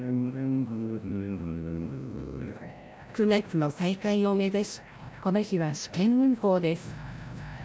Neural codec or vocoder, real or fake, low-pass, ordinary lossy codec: codec, 16 kHz, 0.5 kbps, FreqCodec, larger model; fake; none; none